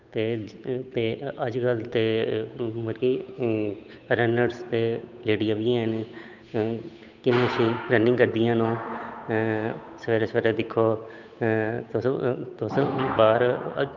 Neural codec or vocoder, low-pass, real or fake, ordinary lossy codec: codec, 16 kHz, 8 kbps, FunCodec, trained on Chinese and English, 25 frames a second; 7.2 kHz; fake; none